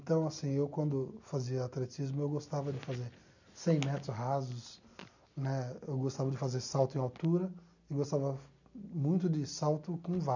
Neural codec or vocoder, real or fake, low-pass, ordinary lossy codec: none; real; 7.2 kHz; none